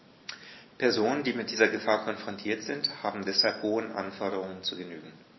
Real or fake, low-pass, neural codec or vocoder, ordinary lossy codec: real; 7.2 kHz; none; MP3, 24 kbps